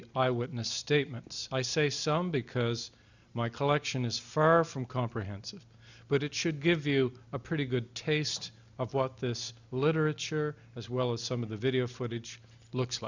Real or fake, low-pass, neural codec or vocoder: real; 7.2 kHz; none